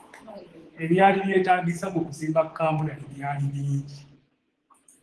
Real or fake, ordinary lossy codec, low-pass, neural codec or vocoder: fake; Opus, 16 kbps; 10.8 kHz; codec, 24 kHz, 3.1 kbps, DualCodec